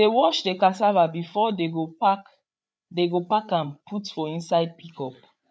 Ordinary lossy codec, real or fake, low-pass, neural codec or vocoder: none; fake; none; codec, 16 kHz, 8 kbps, FreqCodec, larger model